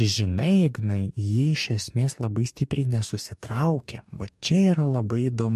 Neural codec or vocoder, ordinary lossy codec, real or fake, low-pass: codec, 44.1 kHz, 2.6 kbps, DAC; MP3, 64 kbps; fake; 14.4 kHz